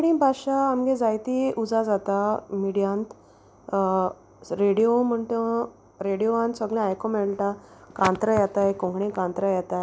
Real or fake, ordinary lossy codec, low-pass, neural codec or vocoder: real; none; none; none